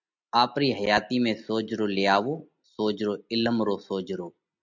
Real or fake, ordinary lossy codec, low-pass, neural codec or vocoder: real; MP3, 64 kbps; 7.2 kHz; none